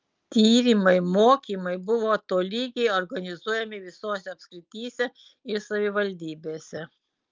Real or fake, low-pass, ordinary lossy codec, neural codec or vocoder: real; 7.2 kHz; Opus, 24 kbps; none